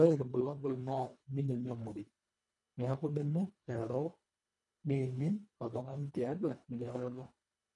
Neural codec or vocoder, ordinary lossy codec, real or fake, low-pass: codec, 24 kHz, 1.5 kbps, HILCodec; none; fake; 10.8 kHz